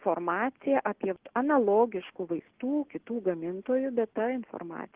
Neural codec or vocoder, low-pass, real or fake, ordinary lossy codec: none; 3.6 kHz; real; Opus, 16 kbps